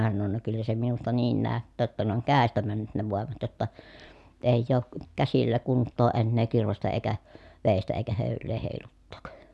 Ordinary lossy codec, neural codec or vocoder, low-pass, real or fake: none; none; none; real